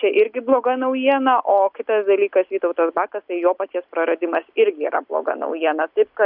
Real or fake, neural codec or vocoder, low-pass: real; none; 5.4 kHz